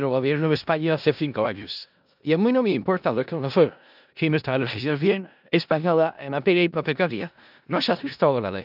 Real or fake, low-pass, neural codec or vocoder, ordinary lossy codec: fake; 5.4 kHz; codec, 16 kHz in and 24 kHz out, 0.4 kbps, LongCat-Audio-Codec, four codebook decoder; none